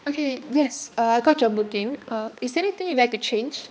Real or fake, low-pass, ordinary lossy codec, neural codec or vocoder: fake; none; none; codec, 16 kHz, 2 kbps, X-Codec, HuBERT features, trained on balanced general audio